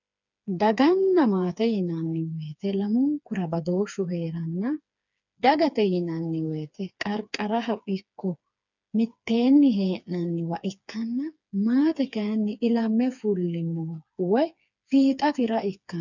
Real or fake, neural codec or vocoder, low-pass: fake; codec, 16 kHz, 4 kbps, FreqCodec, smaller model; 7.2 kHz